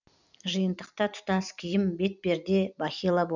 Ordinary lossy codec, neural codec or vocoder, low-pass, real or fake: none; vocoder, 44.1 kHz, 128 mel bands every 256 samples, BigVGAN v2; 7.2 kHz; fake